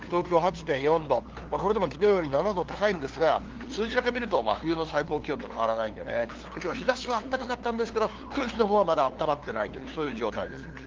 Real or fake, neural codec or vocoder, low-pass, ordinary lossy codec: fake; codec, 16 kHz, 2 kbps, FunCodec, trained on LibriTTS, 25 frames a second; 7.2 kHz; Opus, 16 kbps